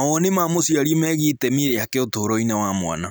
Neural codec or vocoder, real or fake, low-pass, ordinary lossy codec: none; real; none; none